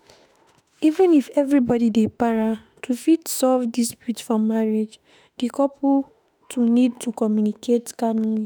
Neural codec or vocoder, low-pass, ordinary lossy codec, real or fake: autoencoder, 48 kHz, 32 numbers a frame, DAC-VAE, trained on Japanese speech; none; none; fake